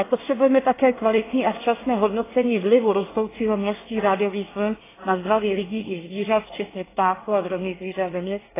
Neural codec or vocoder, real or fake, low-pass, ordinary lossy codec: codec, 24 kHz, 1 kbps, SNAC; fake; 3.6 kHz; AAC, 16 kbps